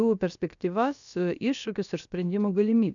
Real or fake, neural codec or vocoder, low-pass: fake; codec, 16 kHz, about 1 kbps, DyCAST, with the encoder's durations; 7.2 kHz